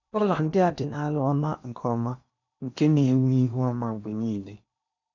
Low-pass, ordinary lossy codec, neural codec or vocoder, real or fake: 7.2 kHz; none; codec, 16 kHz in and 24 kHz out, 0.8 kbps, FocalCodec, streaming, 65536 codes; fake